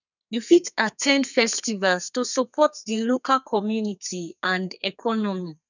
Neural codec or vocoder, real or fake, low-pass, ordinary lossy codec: codec, 32 kHz, 1.9 kbps, SNAC; fake; 7.2 kHz; none